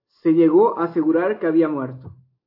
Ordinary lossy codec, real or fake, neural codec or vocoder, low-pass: AAC, 32 kbps; real; none; 5.4 kHz